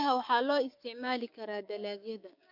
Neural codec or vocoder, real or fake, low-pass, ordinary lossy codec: codec, 16 kHz, 6 kbps, DAC; fake; 5.4 kHz; MP3, 48 kbps